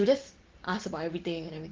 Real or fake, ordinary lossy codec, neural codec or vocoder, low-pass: real; Opus, 16 kbps; none; 7.2 kHz